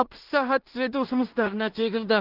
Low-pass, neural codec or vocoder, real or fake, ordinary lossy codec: 5.4 kHz; codec, 16 kHz in and 24 kHz out, 0.4 kbps, LongCat-Audio-Codec, two codebook decoder; fake; Opus, 16 kbps